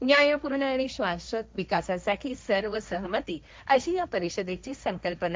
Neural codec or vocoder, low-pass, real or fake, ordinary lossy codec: codec, 16 kHz, 1.1 kbps, Voila-Tokenizer; none; fake; none